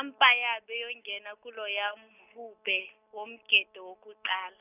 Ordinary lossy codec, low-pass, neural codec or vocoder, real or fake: none; 3.6 kHz; none; real